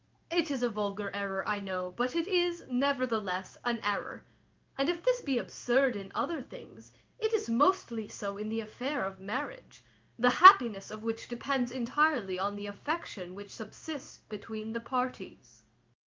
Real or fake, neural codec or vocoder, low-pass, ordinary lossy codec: fake; codec, 16 kHz in and 24 kHz out, 1 kbps, XY-Tokenizer; 7.2 kHz; Opus, 24 kbps